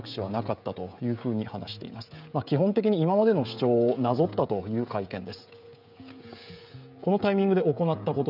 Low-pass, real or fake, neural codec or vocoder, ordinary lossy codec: 5.4 kHz; fake; codec, 16 kHz, 16 kbps, FreqCodec, smaller model; none